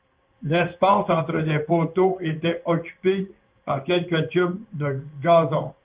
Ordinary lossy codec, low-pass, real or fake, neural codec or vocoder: Opus, 32 kbps; 3.6 kHz; fake; codec, 16 kHz in and 24 kHz out, 1 kbps, XY-Tokenizer